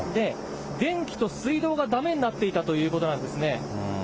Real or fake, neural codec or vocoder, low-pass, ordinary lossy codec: real; none; none; none